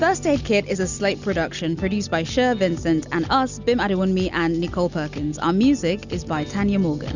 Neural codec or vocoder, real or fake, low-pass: none; real; 7.2 kHz